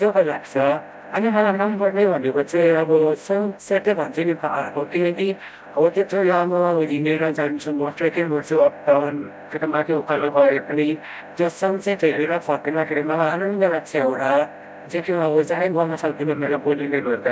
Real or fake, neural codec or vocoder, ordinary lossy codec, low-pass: fake; codec, 16 kHz, 0.5 kbps, FreqCodec, smaller model; none; none